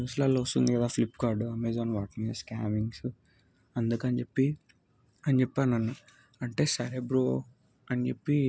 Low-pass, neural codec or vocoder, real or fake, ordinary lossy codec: none; none; real; none